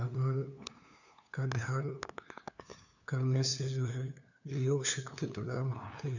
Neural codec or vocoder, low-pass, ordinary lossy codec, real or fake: codec, 16 kHz, 2 kbps, FunCodec, trained on LibriTTS, 25 frames a second; 7.2 kHz; none; fake